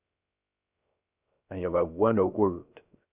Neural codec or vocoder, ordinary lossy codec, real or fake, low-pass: codec, 16 kHz, 0.3 kbps, FocalCodec; Opus, 64 kbps; fake; 3.6 kHz